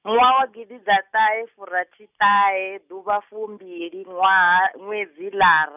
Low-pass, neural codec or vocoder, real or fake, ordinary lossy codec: 3.6 kHz; none; real; none